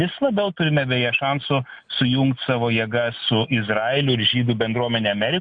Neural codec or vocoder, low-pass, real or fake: none; 9.9 kHz; real